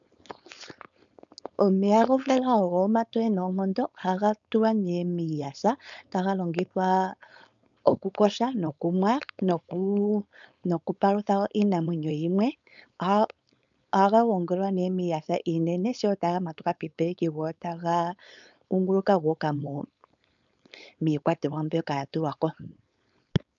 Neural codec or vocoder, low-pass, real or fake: codec, 16 kHz, 4.8 kbps, FACodec; 7.2 kHz; fake